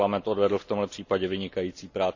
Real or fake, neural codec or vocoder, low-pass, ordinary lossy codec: real; none; 7.2 kHz; none